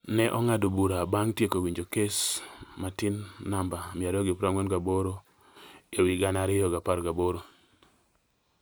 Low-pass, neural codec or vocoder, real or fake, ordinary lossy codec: none; none; real; none